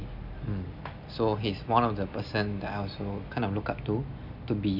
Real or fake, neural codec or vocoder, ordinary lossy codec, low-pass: real; none; none; 5.4 kHz